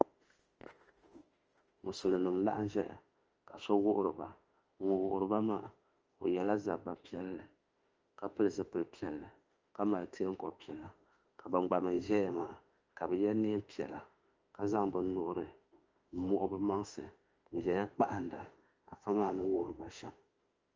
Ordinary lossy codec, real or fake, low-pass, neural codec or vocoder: Opus, 24 kbps; fake; 7.2 kHz; autoencoder, 48 kHz, 32 numbers a frame, DAC-VAE, trained on Japanese speech